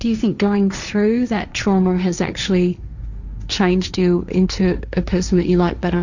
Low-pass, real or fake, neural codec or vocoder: 7.2 kHz; fake; codec, 16 kHz, 1.1 kbps, Voila-Tokenizer